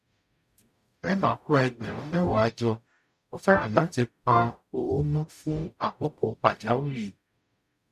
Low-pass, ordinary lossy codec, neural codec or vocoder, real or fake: 14.4 kHz; none; codec, 44.1 kHz, 0.9 kbps, DAC; fake